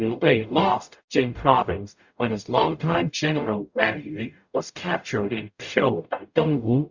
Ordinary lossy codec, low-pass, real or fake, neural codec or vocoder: Opus, 64 kbps; 7.2 kHz; fake; codec, 44.1 kHz, 0.9 kbps, DAC